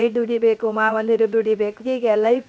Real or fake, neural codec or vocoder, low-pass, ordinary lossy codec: fake; codec, 16 kHz, 0.7 kbps, FocalCodec; none; none